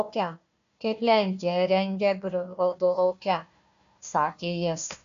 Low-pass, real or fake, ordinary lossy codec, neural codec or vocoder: 7.2 kHz; fake; MP3, 64 kbps; codec, 16 kHz, 1 kbps, FunCodec, trained on Chinese and English, 50 frames a second